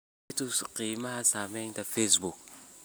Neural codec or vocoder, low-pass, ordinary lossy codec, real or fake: none; none; none; real